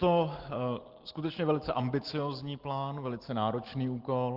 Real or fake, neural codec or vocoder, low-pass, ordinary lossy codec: real; none; 5.4 kHz; Opus, 16 kbps